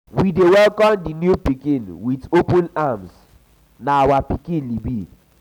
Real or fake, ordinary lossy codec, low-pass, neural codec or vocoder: real; none; 19.8 kHz; none